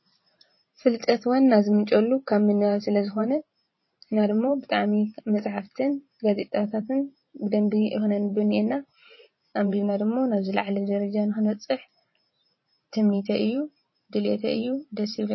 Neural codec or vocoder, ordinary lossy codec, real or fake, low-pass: vocoder, 44.1 kHz, 128 mel bands every 512 samples, BigVGAN v2; MP3, 24 kbps; fake; 7.2 kHz